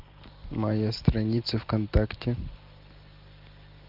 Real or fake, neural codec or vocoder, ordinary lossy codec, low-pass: real; none; Opus, 24 kbps; 5.4 kHz